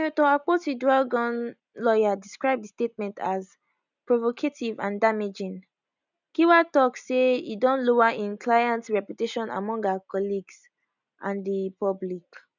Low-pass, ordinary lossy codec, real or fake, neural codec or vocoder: 7.2 kHz; none; real; none